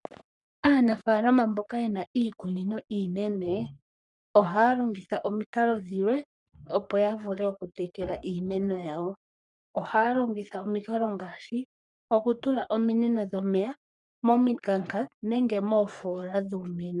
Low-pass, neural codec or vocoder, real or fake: 10.8 kHz; codec, 44.1 kHz, 3.4 kbps, Pupu-Codec; fake